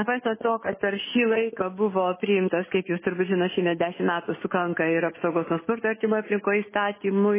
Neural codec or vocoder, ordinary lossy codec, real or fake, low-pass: none; MP3, 16 kbps; real; 3.6 kHz